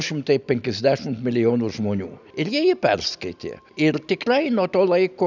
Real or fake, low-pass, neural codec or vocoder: real; 7.2 kHz; none